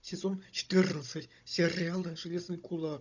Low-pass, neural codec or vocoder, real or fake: 7.2 kHz; codec, 16 kHz, 16 kbps, FunCodec, trained on Chinese and English, 50 frames a second; fake